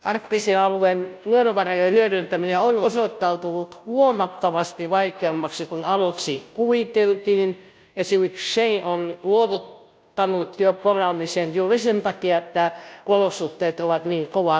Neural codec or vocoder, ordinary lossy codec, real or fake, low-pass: codec, 16 kHz, 0.5 kbps, FunCodec, trained on Chinese and English, 25 frames a second; none; fake; none